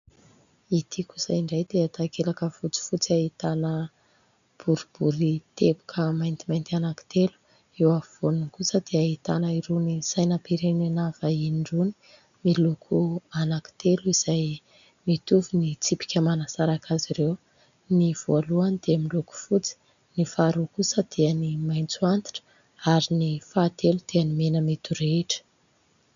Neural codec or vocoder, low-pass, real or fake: none; 7.2 kHz; real